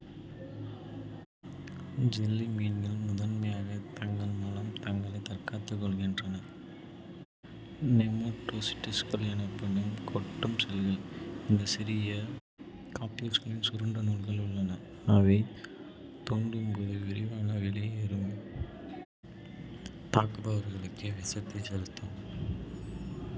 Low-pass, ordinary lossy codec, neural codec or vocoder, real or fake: none; none; none; real